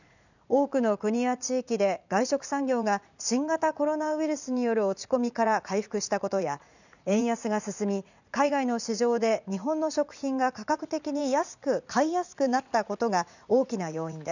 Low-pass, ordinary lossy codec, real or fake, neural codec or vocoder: 7.2 kHz; none; fake; vocoder, 44.1 kHz, 80 mel bands, Vocos